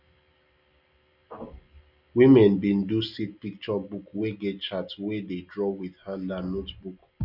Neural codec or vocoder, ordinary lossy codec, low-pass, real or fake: none; none; 5.4 kHz; real